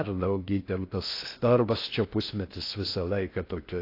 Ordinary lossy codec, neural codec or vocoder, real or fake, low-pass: AAC, 32 kbps; codec, 16 kHz in and 24 kHz out, 0.6 kbps, FocalCodec, streaming, 4096 codes; fake; 5.4 kHz